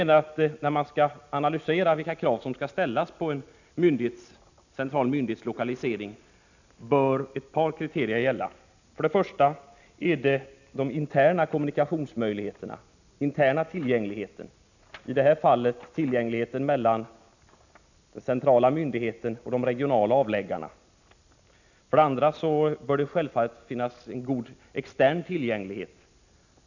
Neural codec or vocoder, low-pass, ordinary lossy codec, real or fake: none; 7.2 kHz; none; real